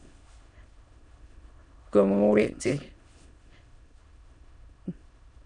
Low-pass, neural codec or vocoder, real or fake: 9.9 kHz; autoencoder, 22.05 kHz, a latent of 192 numbers a frame, VITS, trained on many speakers; fake